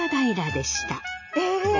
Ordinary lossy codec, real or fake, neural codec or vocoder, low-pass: none; real; none; 7.2 kHz